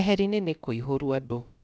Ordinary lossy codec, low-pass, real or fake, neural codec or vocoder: none; none; fake; codec, 16 kHz, about 1 kbps, DyCAST, with the encoder's durations